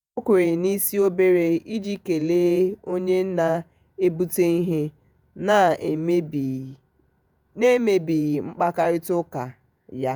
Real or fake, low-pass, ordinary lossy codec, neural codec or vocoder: fake; none; none; vocoder, 48 kHz, 128 mel bands, Vocos